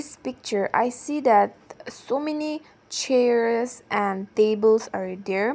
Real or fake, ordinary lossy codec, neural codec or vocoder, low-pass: real; none; none; none